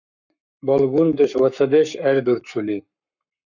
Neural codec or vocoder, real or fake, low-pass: codec, 44.1 kHz, 7.8 kbps, Pupu-Codec; fake; 7.2 kHz